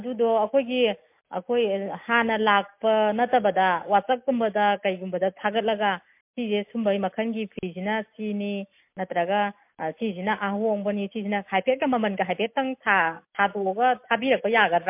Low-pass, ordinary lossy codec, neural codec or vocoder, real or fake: 3.6 kHz; MP3, 32 kbps; none; real